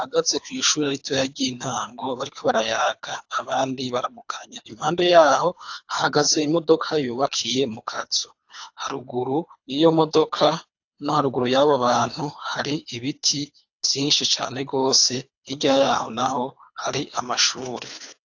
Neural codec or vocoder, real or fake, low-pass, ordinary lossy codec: codec, 24 kHz, 3 kbps, HILCodec; fake; 7.2 kHz; AAC, 48 kbps